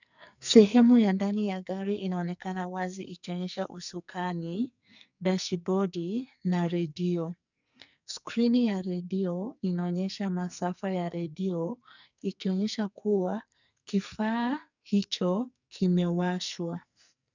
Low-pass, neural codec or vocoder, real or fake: 7.2 kHz; codec, 44.1 kHz, 2.6 kbps, SNAC; fake